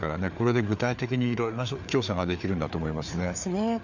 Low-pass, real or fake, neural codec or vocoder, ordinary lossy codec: 7.2 kHz; fake; codec, 16 kHz, 4 kbps, FreqCodec, larger model; none